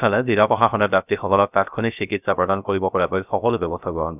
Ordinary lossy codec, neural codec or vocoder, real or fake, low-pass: none; codec, 16 kHz, 0.3 kbps, FocalCodec; fake; 3.6 kHz